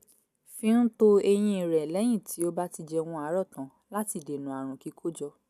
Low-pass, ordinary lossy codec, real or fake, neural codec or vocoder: 14.4 kHz; none; real; none